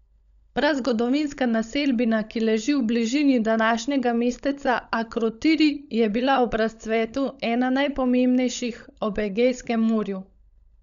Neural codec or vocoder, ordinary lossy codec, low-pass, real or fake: codec, 16 kHz, 16 kbps, FunCodec, trained on LibriTTS, 50 frames a second; none; 7.2 kHz; fake